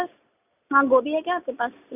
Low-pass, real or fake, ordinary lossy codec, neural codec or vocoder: 3.6 kHz; real; none; none